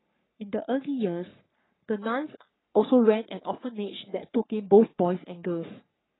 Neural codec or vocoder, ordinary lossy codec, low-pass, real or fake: codec, 44.1 kHz, 3.4 kbps, Pupu-Codec; AAC, 16 kbps; 7.2 kHz; fake